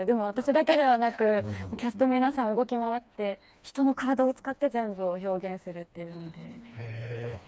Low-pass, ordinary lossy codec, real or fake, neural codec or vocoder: none; none; fake; codec, 16 kHz, 2 kbps, FreqCodec, smaller model